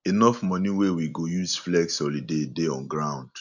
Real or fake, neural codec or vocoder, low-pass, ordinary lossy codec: real; none; 7.2 kHz; none